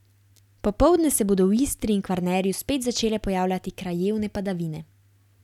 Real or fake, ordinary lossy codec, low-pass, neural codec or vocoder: real; none; 19.8 kHz; none